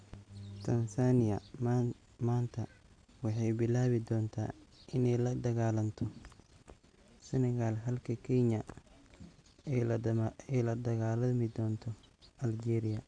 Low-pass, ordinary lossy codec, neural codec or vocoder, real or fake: 9.9 kHz; AAC, 64 kbps; none; real